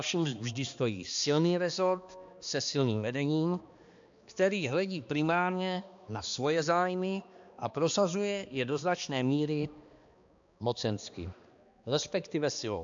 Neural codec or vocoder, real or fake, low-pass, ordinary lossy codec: codec, 16 kHz, 2 kbps, X-Codec, HuBERT features, trained on balanced general audio; fake; 7.2 kHz; AAC, 64 kbps